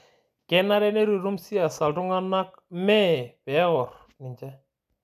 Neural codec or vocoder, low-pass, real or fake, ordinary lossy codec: none; 14.4 kHz; real; none